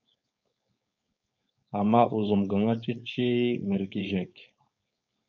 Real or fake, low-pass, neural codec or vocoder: fake; 7.2 kHz; codec, 16 kHz, 4.8 kbps, FACodec